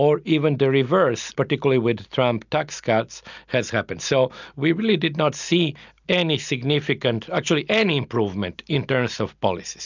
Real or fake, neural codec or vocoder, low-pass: real; none; 7.2 kHz